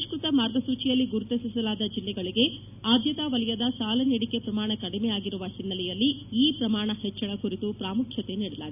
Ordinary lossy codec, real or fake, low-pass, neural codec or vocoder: none; real; 3.6 kHz; none